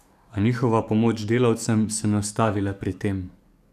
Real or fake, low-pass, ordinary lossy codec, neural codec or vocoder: fake; 14.4 kHz; none; codec, 44.1 kHz, 7.8 kbps, DAC